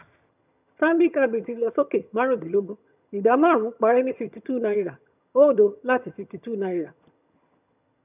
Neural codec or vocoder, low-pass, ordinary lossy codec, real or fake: vocoder, 22.05 kHz, 80 mel bands, HiFi-GAN; 3.6 kHz; none; fake